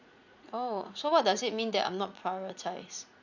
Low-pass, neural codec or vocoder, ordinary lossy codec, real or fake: 7.2 kHz; vocoder, 44.1 kHz, 80 mel bands, Vocos; none; fake